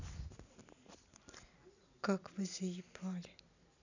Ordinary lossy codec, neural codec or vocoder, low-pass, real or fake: none; none; 7.2 kHz; real